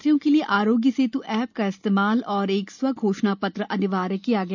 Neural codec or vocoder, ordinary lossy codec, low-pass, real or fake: none; none; 7.2 kHz; real